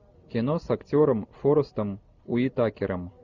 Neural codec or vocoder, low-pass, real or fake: none; 7.2 kHz; real